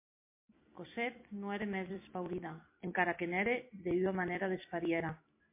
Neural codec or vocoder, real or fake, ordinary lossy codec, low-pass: vocoder, 44.1 kHz, 128 mel bands every 256 samples, BigVGAN v2; fake; MP3, 24 kbps; 3.6 kHz